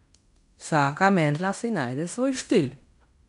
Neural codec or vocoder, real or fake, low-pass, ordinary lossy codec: codec, 16 kHz in and 24 kHz out, 0.9 kbps, LongCat-Audio-Codec, fine tuned four codebook decoder; fake; 10.8 kHz; MP3, 96 kbps